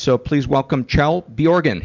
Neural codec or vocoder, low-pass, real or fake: none; 7.2 kHz; real